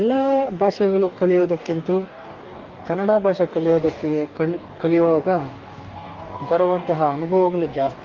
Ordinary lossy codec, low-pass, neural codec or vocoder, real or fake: Opus, 32 kbps; 7.2 kHz; codec, 44.1 kHz, 2.6 kbps, DAC; fake